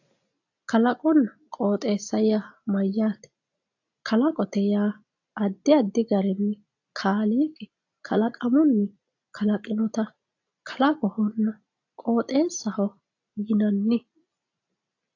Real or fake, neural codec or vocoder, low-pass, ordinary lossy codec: real; none; 7.2 kHz; AAC, 48 kbps